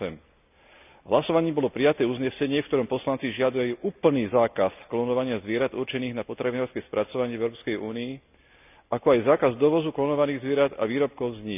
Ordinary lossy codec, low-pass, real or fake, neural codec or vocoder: none; 3.6 kHz; real; none